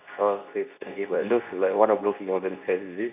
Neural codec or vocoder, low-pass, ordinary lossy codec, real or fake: codec, 24 kHz, 0.9 kbps, WavTokenizer, medium speech release version 2; 3.6 kHz; none; fake